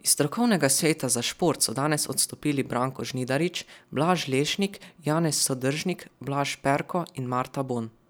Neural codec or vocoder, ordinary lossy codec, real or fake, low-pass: none; none; real; none